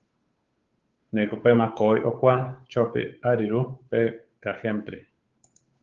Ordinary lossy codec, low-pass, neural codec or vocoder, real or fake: Opus, 32 kbps; 7.2 kHz; codec, 16 kHz, 8 kbps, FunCodec, trained on Chinese and English, 25 frames a second; fake